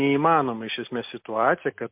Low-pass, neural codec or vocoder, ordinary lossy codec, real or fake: 3.6 kHz; none; MP3, 32 kbps; real